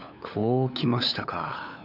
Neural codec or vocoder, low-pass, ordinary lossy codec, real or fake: codec, 16 kHz, 8 kbps, FunCodec, trained on LibriTTS, 25 frames a second; 5.4 kHz; none; fake